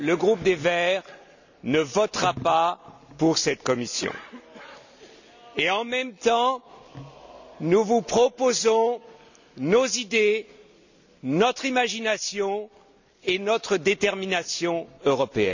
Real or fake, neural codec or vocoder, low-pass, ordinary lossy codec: real; none; 7.2 kHz; none